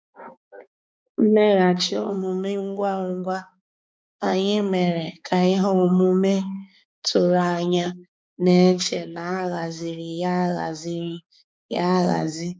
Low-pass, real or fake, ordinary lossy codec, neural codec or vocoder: none; fake; none; codec, 16 kHz, 4 kbps, X-Codec, HuBERT features, trained on balanced general audio